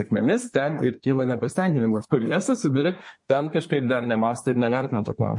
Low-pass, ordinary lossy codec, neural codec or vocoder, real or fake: 10.8 kHz; MP3, 48 kbps; codec, 24 kHz, 1 kbps, SNAC; fake